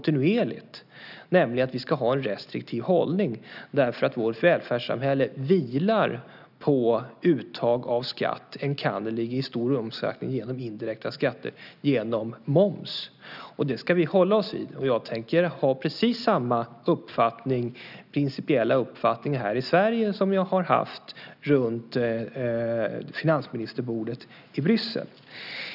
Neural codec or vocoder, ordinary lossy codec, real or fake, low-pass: none; none; real; 5.4 kHz